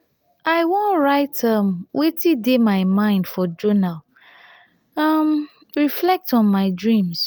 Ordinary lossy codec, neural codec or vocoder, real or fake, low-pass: Opus, 32 kbps; none; real; 19.8 kHz